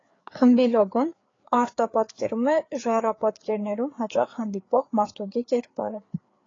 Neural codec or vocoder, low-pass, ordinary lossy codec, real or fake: codec, 16 kHz, 4 kbps, FreqCodec, larger model; 7.2 kHz; AAC, 32 kbps; fake